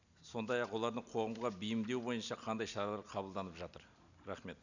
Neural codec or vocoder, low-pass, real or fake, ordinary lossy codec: none; 7.2 kHz; real; none